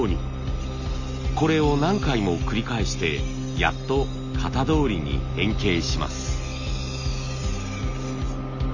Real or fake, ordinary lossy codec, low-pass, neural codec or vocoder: real; none; 7.2 kHz; none